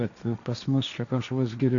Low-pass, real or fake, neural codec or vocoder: 7.2 kHz; fake; codec, 16 kHz, 1.1 kbps, Voila-Tokenizer